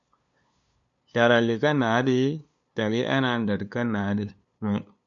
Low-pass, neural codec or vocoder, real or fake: 7.2 kHz; codec, 16 kHz, 2 kbps, FunCodec, trained on LibriTTS, 25 frames a second; fake